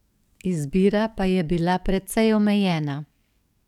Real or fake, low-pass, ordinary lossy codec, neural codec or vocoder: fake; 19.8 kHz; none; codec, 44.1 kHz, 7.8 kbps, DAC